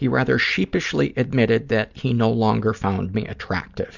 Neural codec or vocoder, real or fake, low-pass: none; real; 7.2 kHz